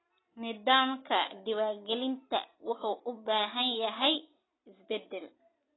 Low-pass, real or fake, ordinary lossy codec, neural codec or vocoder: 7.2 kHz; real; AAC, 16 kbps; none